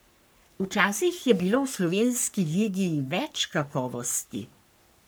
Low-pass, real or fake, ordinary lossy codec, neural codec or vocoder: none; fake; none; codec, 44.1 kHz, 3.4 kbps, Pupu-Codec